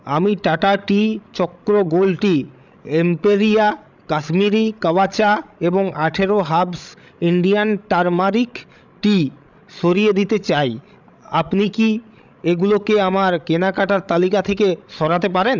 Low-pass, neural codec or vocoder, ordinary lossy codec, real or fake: 7.2 kHz; codec, 16 kHz, 16 kbps, FreqCodec, larger model; none; fake